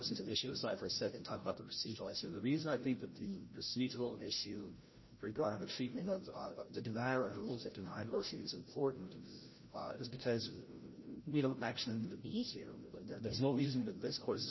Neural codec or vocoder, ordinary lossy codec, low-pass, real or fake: codec, 16 kHz, 0.5 kbps, FreqCodec, larger model; MP3, 24 kbps; 7.2 kHz; fake